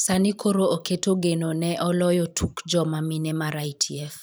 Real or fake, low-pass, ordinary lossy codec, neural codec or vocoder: fake; none; none; vocoder, 44.1 kHz, 128 mel bands every 512 samples, BigVGAN v2